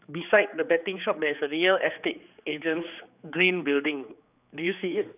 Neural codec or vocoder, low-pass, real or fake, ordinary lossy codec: codec, 16 kHz, 4 kbps, X-Codec, HuBERT features, trained on general audio; 3.6 kHz; fake; none